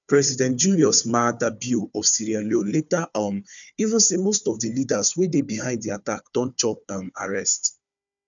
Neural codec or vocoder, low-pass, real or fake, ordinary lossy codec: codec, 16 kHz, 4 kbps, FunCodec, trained on Chinese and English, 50 frames a second; 7.2 kHz; fake; none